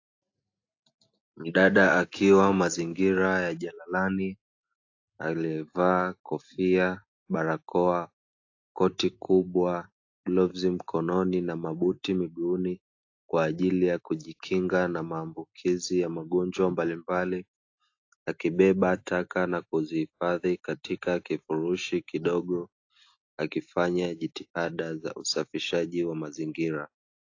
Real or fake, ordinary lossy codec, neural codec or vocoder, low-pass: real; AAC, 48 kbps; none; 7.2 kHz